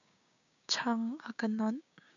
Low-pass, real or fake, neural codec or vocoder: 7.2 kHz; fake; codec, 16 kHz, 6 kbps, DAC